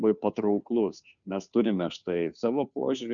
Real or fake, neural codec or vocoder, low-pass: fake; codec, 16 kHz, 2 kbps, FunCodec, trained on Chinese and English, 25 frames a second; 7.2 kHz